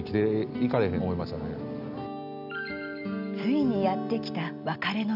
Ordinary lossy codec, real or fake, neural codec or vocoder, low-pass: none; real; none; 5.4 kHz